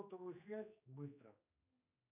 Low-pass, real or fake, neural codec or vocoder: 3.6 kHz; fake; codec, 16 kHz, 2 kbps, X-Codec, HuBERT features, trained on general audio